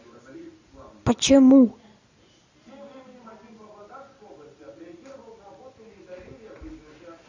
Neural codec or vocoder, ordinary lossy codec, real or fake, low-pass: none; AAC, 48 kbps; real; 7.2 kHz